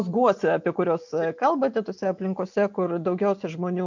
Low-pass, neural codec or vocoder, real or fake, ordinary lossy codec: 7.2 kHz; none; real; MP3, 64 kbps